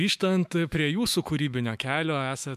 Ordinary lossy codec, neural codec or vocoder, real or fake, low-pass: MP3, 96 kbps; autoencoder, 48 kHz, 128 numbers a frame, DAC-VAE, trained on Japanese speech; fake; 14.4 kHz